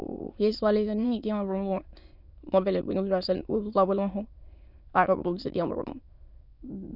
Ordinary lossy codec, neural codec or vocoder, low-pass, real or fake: none; autoencoder, 22.05 kHz, a latent of 192 numbers a frame, VITS, trained on many speakers; 5.4 kHz; fake